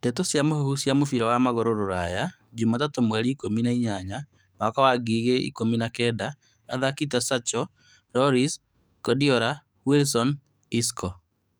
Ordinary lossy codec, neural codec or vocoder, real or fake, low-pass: none; codec, 44.1 kHz, 7.8 kbps, DAC; fake; none